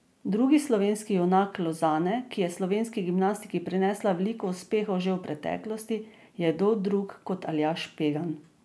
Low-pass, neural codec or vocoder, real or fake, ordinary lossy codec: none; none; real; none